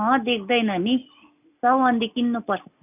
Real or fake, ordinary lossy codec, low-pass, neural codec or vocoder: real; none; 3.6 kHz; none